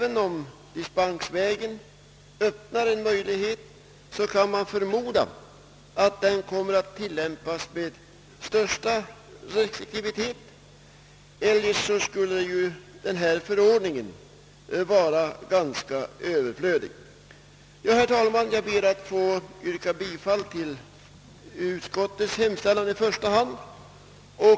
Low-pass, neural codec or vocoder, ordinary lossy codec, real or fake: none; none; none; real